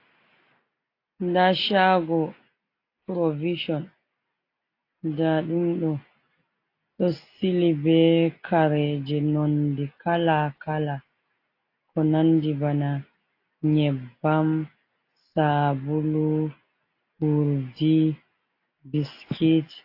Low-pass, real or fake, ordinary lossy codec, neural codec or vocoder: 5.4 kHz; real; AAC, 32 kbps; none